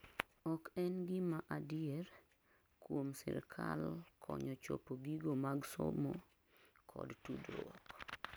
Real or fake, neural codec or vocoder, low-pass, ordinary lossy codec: real; none; none; none